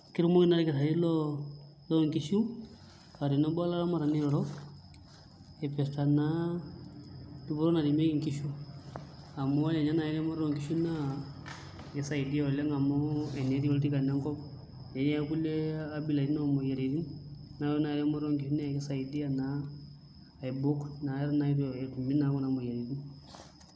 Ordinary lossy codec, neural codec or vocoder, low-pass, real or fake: none; none; none; real